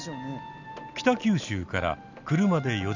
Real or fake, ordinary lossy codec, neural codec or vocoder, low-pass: real; none; none; 7.2 kHz